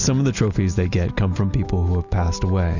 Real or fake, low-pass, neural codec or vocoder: real; 7.2 kHz; none